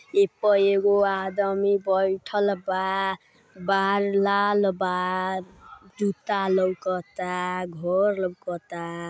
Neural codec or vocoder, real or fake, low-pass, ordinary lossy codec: none; real; none; none